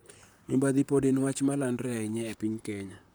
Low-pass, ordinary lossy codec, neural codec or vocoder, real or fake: none; none; vocoder, 44.1 kHz, 128 mel bands, Pupu-Vocoder; fake